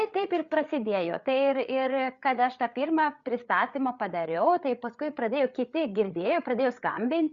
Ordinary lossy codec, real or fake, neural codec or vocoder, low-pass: Opus, 64 kbps; fake; codec, 16 kHz, 8 kbps, FreqCodec, larger model; 7.2 kHz